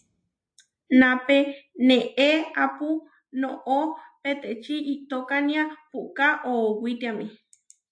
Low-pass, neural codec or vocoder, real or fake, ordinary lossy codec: 9.9 kHz; none; real; AAC, 64 kbps